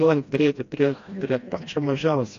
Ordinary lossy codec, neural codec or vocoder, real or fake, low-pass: AAC, 48 kbps; codec, 16 kHz, 1 kbps, FreqCodec, smaller model; fake; 7.2 kHz